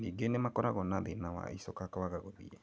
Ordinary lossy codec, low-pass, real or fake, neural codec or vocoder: none; none; real; none